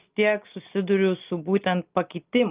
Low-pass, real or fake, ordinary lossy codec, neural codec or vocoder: 3.6 kHz; real; Opus, 32 kbps; none